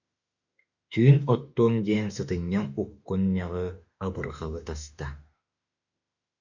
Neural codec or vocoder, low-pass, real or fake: autoencoder, 48 kHz, 32 numbers a frame, DAC-VAE, trained on Japanese speech; 7.2 kHz; fake